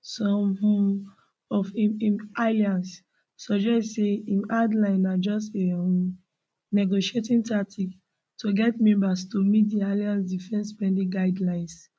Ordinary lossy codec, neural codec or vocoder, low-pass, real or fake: none; none; none; real